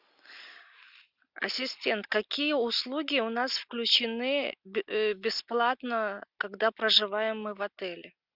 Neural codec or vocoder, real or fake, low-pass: none; real; 5.4 kHz